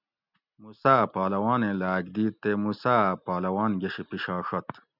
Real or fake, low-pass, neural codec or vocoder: real; 5.4 kHz; none